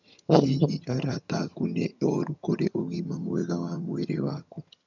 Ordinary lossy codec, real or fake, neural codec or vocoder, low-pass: none; fake; vocoder, 22.05 kHz, 80 mel bands, HiFi-GAN; 7.2 kHz